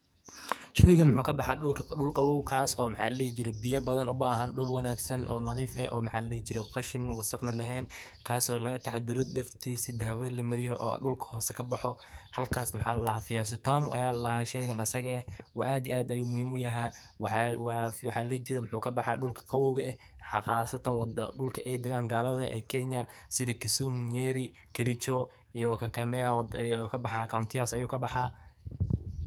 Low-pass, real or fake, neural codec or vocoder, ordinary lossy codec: none; fake; codec, 44.1 kHz, 2.6 kbps, SNAC; none